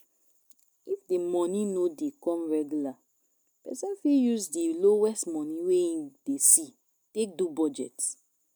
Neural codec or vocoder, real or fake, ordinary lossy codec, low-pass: none; real; none; none